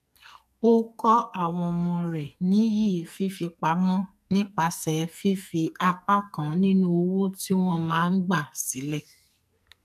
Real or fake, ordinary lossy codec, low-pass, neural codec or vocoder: fake; none; 14.4 kHz; codec, 44.1 kHz, 2.6 kbps, SNAC